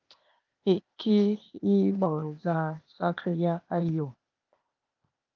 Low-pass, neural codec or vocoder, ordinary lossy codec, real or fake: 7.2 kHz; codec, 16 kHz, 0.8 kbps, ZipCodec; Opus, 24 kbps; fake